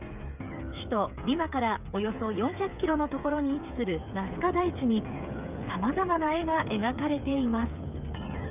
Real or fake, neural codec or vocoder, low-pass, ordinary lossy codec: fake; codec, 16 kHz, 8 kbps, FreqCodec, smaller model; 3.6 kHz; none